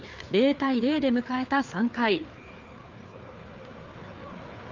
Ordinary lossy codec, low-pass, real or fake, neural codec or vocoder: Opus, 24 kbps; 7.2 kHz; fake; codec, 16 kHz, 4 kbps, FreqCodec, larger model